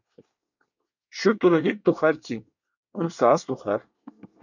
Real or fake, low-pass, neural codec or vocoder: fake; 7.2 kHz; codec, 24 kHz, 1 kbps, SNAC